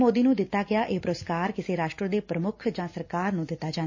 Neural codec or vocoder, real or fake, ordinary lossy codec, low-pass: none; real; MP3, 32 kbps; 7.2 kHz